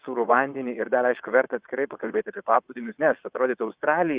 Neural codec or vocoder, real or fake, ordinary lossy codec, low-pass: vocoder, 22.05 kHz, 80 mel bands, WaveNeXt; fake; Opus, 64 kbps; 3.6 kHz